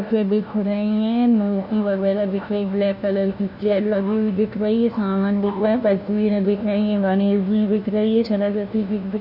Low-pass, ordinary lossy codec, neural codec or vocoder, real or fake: 5.4 kHz; none; codec, 16 kHz, 1 kbps, FunCodec, trained on LibriTTS, 50 frames a second; fake